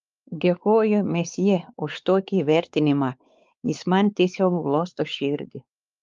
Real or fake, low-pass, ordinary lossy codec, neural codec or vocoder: fake; 7.2 kHz; Opus, 32 kbps; codec, 16 kHz, 4 kbps, X-Codec, WavLM features, trained on Multilingual LibriSpeech